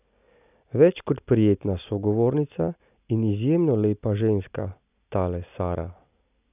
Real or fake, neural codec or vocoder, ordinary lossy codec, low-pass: real; none; none; 3.6 kHz